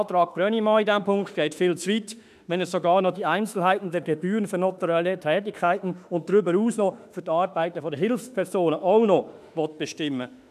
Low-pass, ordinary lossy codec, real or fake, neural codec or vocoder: 14.4 kHz; none; fake; autoencoder, 48 kHz, 32 numbers a frame, DAC-VAE, trained on Japanese speech